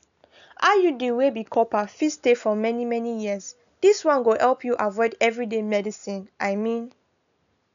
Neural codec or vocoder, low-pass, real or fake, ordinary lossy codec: none; 7.2 kHz; real; MP3, 96 kbps